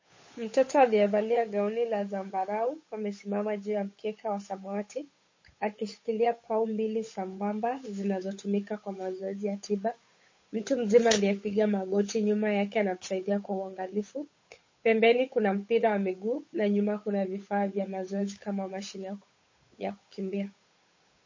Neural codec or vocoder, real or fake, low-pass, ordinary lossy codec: codec, 16 kHz, 8 kbps, FunCodec, trained on Chinese and English, 25 frames a second; fake; 7.2 kHz; MP3, 32 kbps